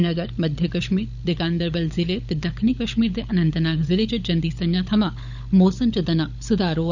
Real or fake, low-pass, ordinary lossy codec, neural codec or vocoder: fake; 7.2 kHz; none; codec, 16 kHz, 16 kbps, FunCodec, trained on LibriTTS, 50 frames a second